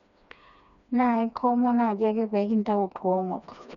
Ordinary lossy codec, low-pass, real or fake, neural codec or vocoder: none; 7.2 kHz; fake; codec, 16 kHz, 2 kbps, FreqCodec, smaller model